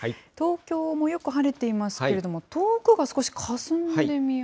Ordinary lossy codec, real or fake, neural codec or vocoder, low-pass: none; real; none; none